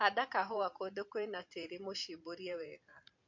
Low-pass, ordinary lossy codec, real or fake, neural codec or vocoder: 7.2 kHz; MP3, 48 kbps; fake; vocoder, 44.1 kHz, 128 mel bands every 512 samples, BigVGAN v2